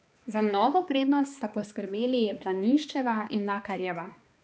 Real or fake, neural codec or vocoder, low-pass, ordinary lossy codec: fake; codec, 16 kHz, 2 kbps, X-Codec, HuBERT features, trained on balanced general audio; none; none